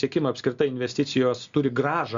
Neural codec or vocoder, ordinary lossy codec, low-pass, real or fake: none; Opus, 64 kbps; 7.2 kHz; real